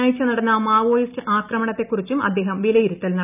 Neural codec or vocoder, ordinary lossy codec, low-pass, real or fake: none; none; 3.6 kHz; real